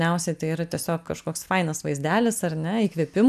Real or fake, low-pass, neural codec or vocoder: real; 14.4 kHz; none